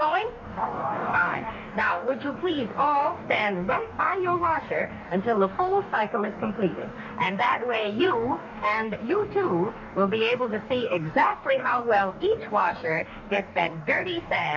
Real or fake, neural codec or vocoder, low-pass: fake; codec, 44.1 kHz, 2.6 kbps, DAC; 7.2 kHz